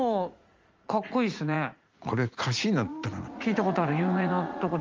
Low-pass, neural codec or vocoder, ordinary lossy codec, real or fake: 7.2 kHz; vocoder, 44.1 kHz, 80 mel bands, Vocos; Opus, 32 kbps; fake